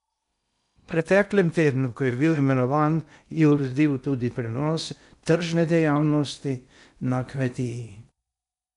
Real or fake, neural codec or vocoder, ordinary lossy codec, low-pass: fake; codec, 16 kHz in and 24 kHz out, 0.8 kbps, FocalCodec, streaming, 65536 codes; none; 10.8 kHz